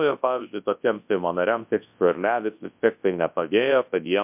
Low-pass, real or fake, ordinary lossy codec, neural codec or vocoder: 3.6 kHz; fake; MP3, 32 kbps; codec, 24 kHz, 0.9 kbps, WavTokenizer, large speech release